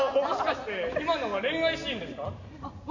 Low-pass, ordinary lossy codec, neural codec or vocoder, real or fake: 7.2 kHz; none; none; real